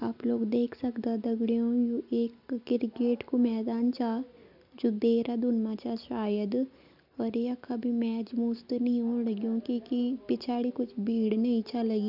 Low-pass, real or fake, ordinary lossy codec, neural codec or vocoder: 5.4 kHz; real; none; none